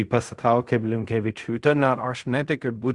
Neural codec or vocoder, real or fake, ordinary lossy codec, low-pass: codec, 16 kHz in and 24 kHz out, 0.4 kbps, LongCat-Audio-Codec, fine tuned four codebook decoder; fake; Opus, 64 kbps; 10.8 kHz